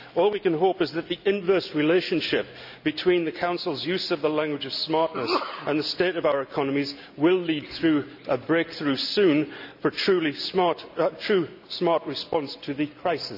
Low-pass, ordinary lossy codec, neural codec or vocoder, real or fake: 5.4 kHz; none; none; real